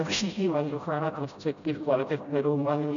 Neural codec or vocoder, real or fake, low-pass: codec, 16 kHz, 0.5 kbps, FreqCodec, smaller model; fake; 7.2 kHz